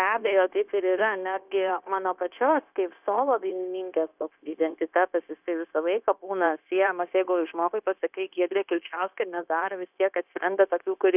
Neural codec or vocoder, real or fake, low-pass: codec, 16 kHz, 0.9 kbps, LongCat-Audio-Codec; fake; 3.6 kHz